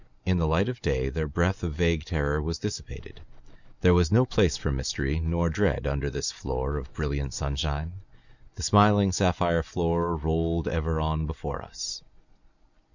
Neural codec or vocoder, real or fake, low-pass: none; real; 7.2 kHz